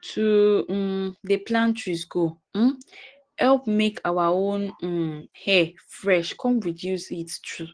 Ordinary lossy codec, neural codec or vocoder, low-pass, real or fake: Opus, 16 kbps; none; 9.9 kHz; real